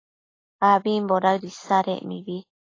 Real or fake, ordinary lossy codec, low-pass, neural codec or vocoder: real; AAC, 32 kbps; 7.2 kHz; none